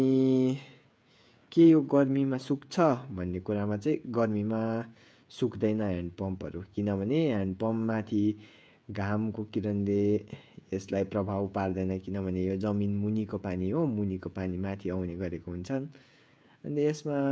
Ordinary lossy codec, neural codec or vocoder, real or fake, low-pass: none; codec, 16 kHz, 16 kbps, FreqCodec, smaller model; fake; none